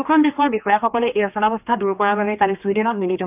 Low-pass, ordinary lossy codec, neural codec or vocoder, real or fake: 3.6 kHz; AAC, 32 kbps; codec, 16 kHz, 2 kbps, X-Codec, HuBERT features, trained on general audio; fake